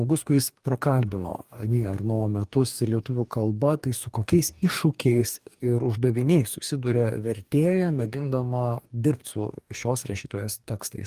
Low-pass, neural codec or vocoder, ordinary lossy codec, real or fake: 14.4 kHz; codec, 44.1 kHz, 2.6 kbps, DAC; Opus, 32 kbps; fake